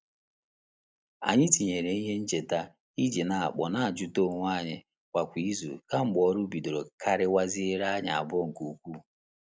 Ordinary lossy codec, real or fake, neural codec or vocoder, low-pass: none; real; none; none